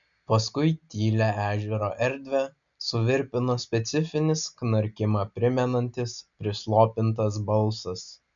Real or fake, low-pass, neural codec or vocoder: real; 7.2 kHz; none